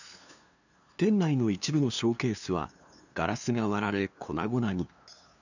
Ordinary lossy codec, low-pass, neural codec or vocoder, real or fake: none; 7.2 kHz; codec, 16 kHz, 2 kbps, FunCodec, trained on LibriTTS, 25 frames a second; fake